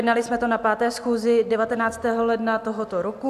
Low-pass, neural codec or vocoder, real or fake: 14.4 kHz; none; real